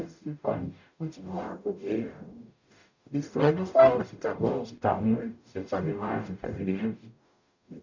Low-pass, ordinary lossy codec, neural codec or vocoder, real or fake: 7.2 kHz; none; codec, 44.1 kHz, 0.9 kbps, DAC; fake